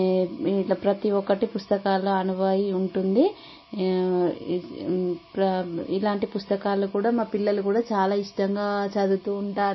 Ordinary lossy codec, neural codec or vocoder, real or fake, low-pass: MP3, 24 kbps; none; real; 7.2 kHz